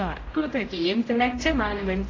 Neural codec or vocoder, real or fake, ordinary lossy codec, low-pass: codec, 16 kHz, 0.5 kbps, X-Codec, HuBERT features, trained on general audio; fake; AAC, 32 kbps; 7.2 kHz